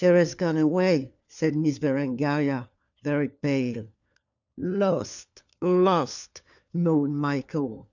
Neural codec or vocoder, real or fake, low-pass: codec, 16 kHz, 4 kbps, FunCodec, trained on LibriTTS, 50 frames a second; fake; 7.2 kHz